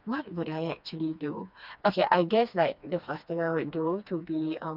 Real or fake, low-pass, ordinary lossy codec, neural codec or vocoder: fake; 5.4 kHz; none; codec, 16 kHz, 2 kbps, FreqCodec, smaller model